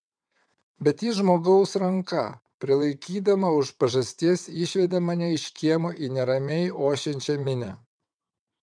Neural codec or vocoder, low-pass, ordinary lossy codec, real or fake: vocoder, 22.05 kHz, 80 mel bands, WaveNeXt; 9.9 kHz; MP3, 96 kbps; fake